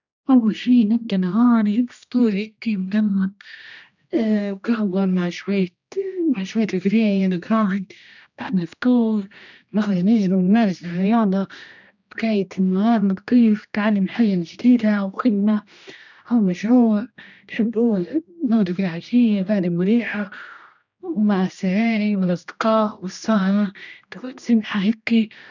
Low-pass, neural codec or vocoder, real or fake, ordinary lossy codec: 7.2 kHz; codec, 16 kHz, 1 kbps, X-Codec, HuBERT features, trained on general audio; fake; none